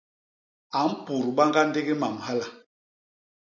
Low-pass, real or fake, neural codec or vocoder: 7.2 kHz; real; none